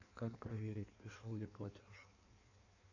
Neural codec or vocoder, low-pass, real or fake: codec, 16 kHz in and 24 kHz out, 1.1 kbps, FireRedTTS-2 codec; 7.2 kHz; fake